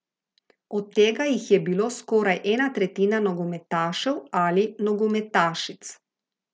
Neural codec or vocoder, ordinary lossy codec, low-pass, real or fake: none; none; none; real